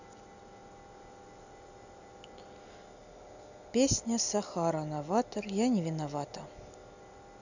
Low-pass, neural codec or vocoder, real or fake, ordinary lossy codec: 7.2 kHz; none; real; none